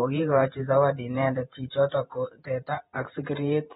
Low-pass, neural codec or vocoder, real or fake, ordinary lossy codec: 19.8 kHz; vocoder, 48 kHz, 128 mel bands, Vocos; fake; AAC, 16 kbps